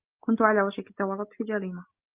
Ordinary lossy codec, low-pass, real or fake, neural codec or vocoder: Opus, 32 kbps; 3.6 kHz; real; none